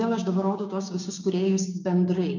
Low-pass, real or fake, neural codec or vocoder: 7.2 kHz; fake; codec, 16 kHz, 6 kbps, DAC